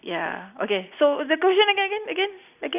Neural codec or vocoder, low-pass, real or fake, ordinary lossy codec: none; 3.6 kHz; real; none